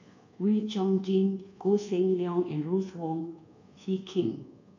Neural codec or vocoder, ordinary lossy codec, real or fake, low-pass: codec, 24 kHz, 1.2 kbps, DualCodec; none; fake; 7.2 kHz